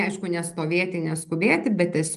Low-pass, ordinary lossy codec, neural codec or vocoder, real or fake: 10.8 kHz; Opus, 32 kbps; none; real